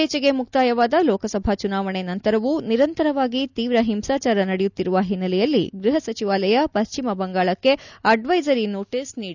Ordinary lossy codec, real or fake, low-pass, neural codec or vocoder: none; real; 7.2 kHz; none